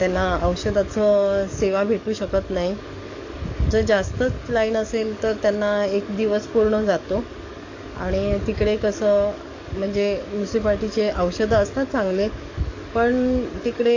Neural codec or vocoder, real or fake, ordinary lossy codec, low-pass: codec, 44.1 kHz, 7.8 kbps, DAC; fake; AAC, 48 kbps; 7.2 kHz